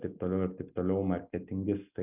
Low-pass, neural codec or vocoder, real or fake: 3.6 kHz; none; real